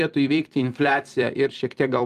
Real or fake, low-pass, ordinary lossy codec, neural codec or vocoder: fake; 14.4 kHz; Opus, 32 kbps; vocoder, 44.1 kHz, 128 mel bands every 256 samples, BigVGAN v2